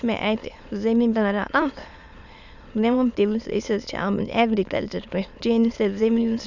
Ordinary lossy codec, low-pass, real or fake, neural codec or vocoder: none; 7.2 kHz; fake; autoencoder, 22.05 kHz, a latent of 192 numbers a frame, VITS, trained on many speakers